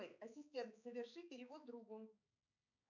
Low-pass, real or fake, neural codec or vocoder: 7.2 kHz; fake; codec, 16 kHz, 4 kbps, X-Codec, HuBERT features, trained on balanced general audio